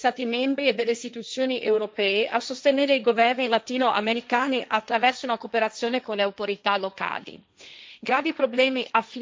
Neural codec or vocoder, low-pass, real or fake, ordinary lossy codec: codec, 16 kHz, 1.1 kbps, Voila-Tokenizer; none; fake; none